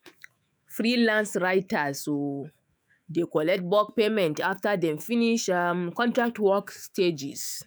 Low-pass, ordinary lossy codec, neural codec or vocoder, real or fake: none; none; autoencoder, 48 kHz, 128 numbers a frame, DAC-VAE, trained on Japanese speech; fake